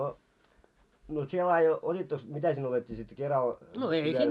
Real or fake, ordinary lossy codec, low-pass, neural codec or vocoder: real; none; none; none